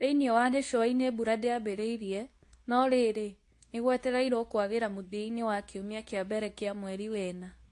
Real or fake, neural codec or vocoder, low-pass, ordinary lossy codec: fake; codec, 24 kHz, 0.9 kbps, WavTokenizer, medium speech release version 2; 10.8 kHz; AAC, 48 kbps